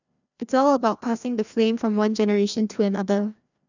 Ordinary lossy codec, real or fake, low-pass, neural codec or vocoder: none; fake; 7.2 kHz; codec, 16 kHz, 1 kbps, FreqCodec, larger model